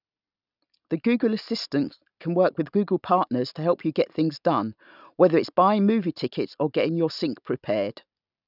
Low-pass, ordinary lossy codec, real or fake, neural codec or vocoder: 5.4 kHz; none; real; none